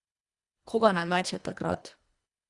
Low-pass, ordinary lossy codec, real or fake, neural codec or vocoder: 10.8 kHz; Opus, 64 kbps; fake; codec, 24 kHz, 1.5 kbps, HILCodec